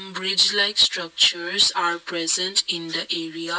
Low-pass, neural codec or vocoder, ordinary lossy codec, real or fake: none; none; none; real